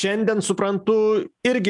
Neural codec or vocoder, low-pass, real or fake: none; 10.8 kHz; real